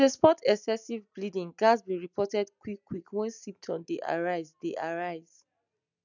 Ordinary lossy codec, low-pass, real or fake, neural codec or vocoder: none; 7.2 kHz; real; none